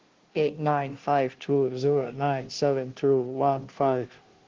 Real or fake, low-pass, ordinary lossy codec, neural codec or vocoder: fake; 7.2 kHz; Opus, 16 kbps; codec, 16 kHz, 0.5 kbps, FunCodec, trained on Chinese and English, 25 frames a second